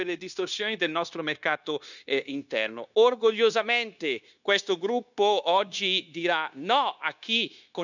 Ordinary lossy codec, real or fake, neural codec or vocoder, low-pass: none; fake; codec, 16 kHz, 0.9 kbps, LongCat-Audio-Codec; 7.2 kHz